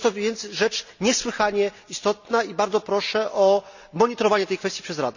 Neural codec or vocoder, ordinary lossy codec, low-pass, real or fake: none; none; 7.2 kHz; real